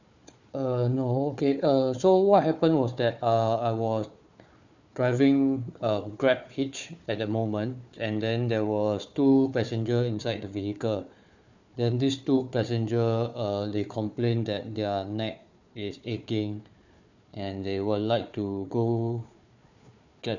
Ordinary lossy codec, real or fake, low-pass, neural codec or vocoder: none; fake; 7.2 kHz; codec, 16 kHz, 4 kbps, FunCodec, trained on Chinese and English, 50 frames a second